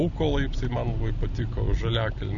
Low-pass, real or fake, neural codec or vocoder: 7.2 kHz; real; none